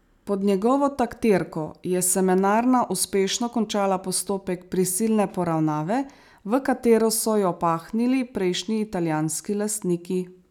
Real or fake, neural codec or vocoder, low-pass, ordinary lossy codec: real; none; 19.8 kHz; none